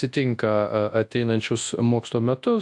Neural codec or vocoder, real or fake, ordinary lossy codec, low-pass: codec, 24 kHz, 0.9 kbps, WavTokenizer, large speech release; fake; AAC, 64 kbps; 10.8 kHz